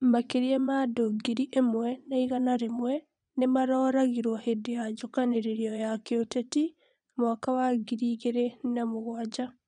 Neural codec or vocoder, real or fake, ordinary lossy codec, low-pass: vocoder, 22.05 kHz, 80 mel bands, Vocos; fake; none; 9.9 kHz